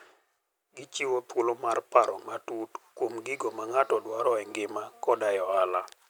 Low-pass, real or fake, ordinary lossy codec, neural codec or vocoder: none; real; none; none